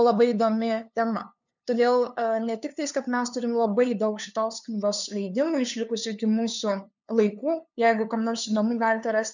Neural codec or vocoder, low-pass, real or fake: codec, 16 kHz, 2 kbps, FunCodec, trained on LibriTTS, 25 frames a second; 7.2 kHz; fake